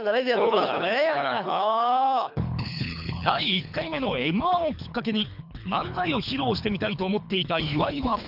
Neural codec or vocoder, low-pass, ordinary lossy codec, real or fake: codec, 24 kHz, 3 kbps, HILCodec; 5.4 kHz; none; fake